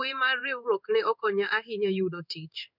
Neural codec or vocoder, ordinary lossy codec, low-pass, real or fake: codec, 16 kHz in and 24 kHz out, 1 kbps, XY-Tokenizer; none; 5.4 kHz; fake